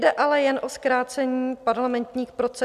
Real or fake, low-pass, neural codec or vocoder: real; 14.4 kHz; none